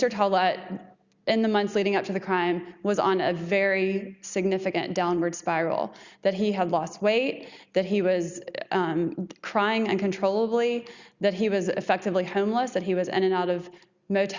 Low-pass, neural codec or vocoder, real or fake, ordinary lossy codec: 7.2 kHz; none; real; Opus, 64 kbps